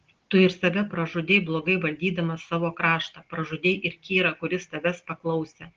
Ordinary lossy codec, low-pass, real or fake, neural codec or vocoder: Opus, 16 kbps; 7.2 kHz; real; none